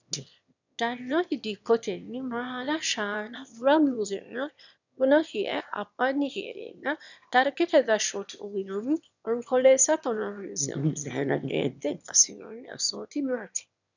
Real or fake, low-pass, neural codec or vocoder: fake; 7.2 kHz; autoencoder, 22.05 kHz, a latent of 192 numbers a frame, VITS, trained on one speaker